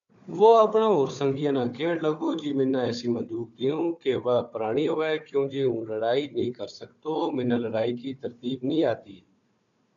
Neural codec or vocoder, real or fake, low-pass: codec, 16 kHz, 4 kbps, FunCodec, trained on Chinese and English, 50 frames a second; fake; 7.2 kHz